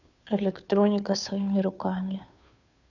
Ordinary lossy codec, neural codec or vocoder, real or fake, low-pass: none; codec, 16 kHz, 2 kbps, FunCodec, trained on Chinese and English, 25 frames a second; fake; 7.2 kHz